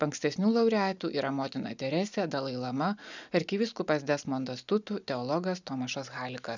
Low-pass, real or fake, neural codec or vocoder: 7.2 kHz; real; none